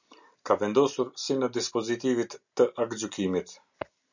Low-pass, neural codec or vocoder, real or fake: 7.2 kHz; none; real